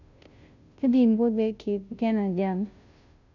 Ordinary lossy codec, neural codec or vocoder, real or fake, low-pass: none; codec, 16 kHz, 0.5 kbps, FunCodec, trained on Chinese and English, 25 frames a second; fake; 7.2 kHz